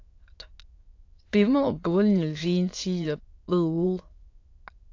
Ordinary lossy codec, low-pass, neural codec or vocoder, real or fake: AAC, 48 kbps; 7.2 kHz; autoencoder, 22.05 kHz, a latent of 192 numbers a frame, VITS, trained on many speakers; fake